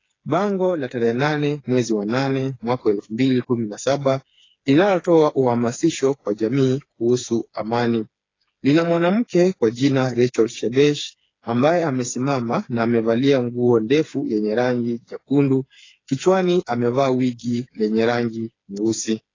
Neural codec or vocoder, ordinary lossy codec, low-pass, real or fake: codec, 16 kHz, 4 kbps, FreqCodec, smaller model; AAC, 32 kbps; 7.2 kHz; fake